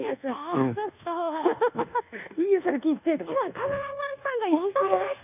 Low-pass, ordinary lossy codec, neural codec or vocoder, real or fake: 3.6 kHz; none; codec, 24 kHz, 1.2 kbps, DualCodec; fake